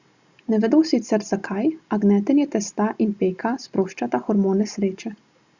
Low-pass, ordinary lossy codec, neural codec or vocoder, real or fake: 7.2 kHz; Opus, 64 kbps; none; real